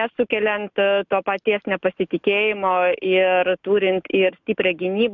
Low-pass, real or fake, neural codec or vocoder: 7.2 kHz; real; none